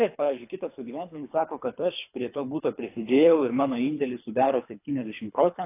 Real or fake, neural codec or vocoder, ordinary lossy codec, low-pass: fake; codec, 24 kHz, 3 kbps, HILCodec; AAC, 24 kbps; 3.6 kHz